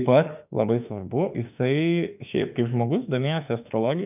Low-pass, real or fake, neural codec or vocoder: 3.6 kHz; fake; autoencoder, 48 kHz, 32 numbers a frame, DAC-VAE, trained on Japanese speech